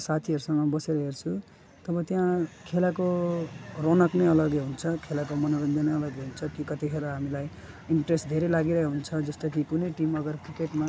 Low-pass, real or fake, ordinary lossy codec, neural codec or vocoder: none; real; none; none